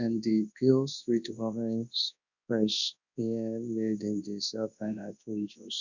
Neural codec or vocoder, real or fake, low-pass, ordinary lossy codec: codec, 24 kHz, 0.9 kbps, WavTokenizer, large speech release; fake; 7.2 kHz; none